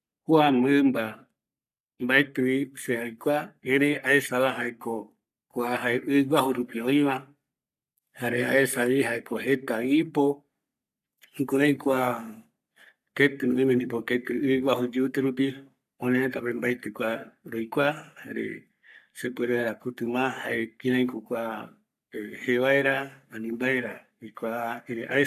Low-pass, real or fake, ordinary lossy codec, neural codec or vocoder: 14.4 kHz; fake; none; codec, 44.1 kHz, 3.4 kbps, Pupu-Codec